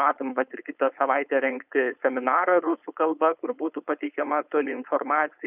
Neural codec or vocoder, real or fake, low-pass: codec, 16 kHz, 4.8 kbps, FACodec; fake; 3.6 kHz